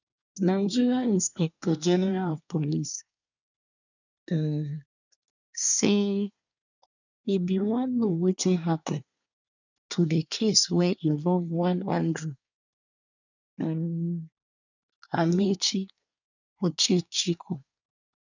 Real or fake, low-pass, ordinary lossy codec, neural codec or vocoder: fake; 7.2 kHz; none; codec, 24 kHz, 1 kbps, SNAC